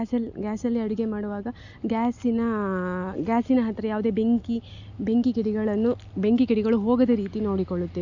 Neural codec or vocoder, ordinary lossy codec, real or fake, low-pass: none; none; real; 7.2 kHz